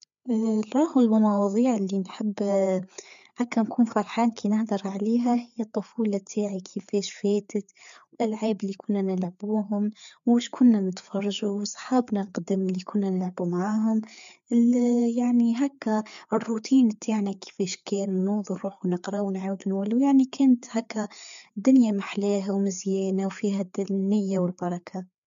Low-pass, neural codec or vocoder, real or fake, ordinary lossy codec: 7.2 kHz; codec, 16 kHz, 4 kbps, FreqCodec, larger model; fake; MP3, 64 kbps